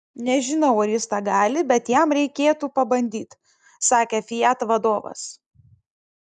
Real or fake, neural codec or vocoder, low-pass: real; none; 10.8 kHz